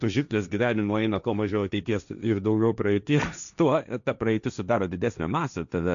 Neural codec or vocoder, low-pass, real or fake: codec, 16 kHz, 1.1 kbps, Voila-Tokenizer; 7.2 kHz; fake